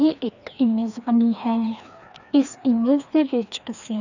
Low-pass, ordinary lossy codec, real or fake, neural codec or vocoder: 7.2 kHz; none; fake; codec, 16 kHz, 2 kbps, FreqCodec, larger model